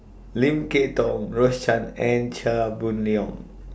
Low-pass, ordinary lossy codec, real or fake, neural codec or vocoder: none; none; real; none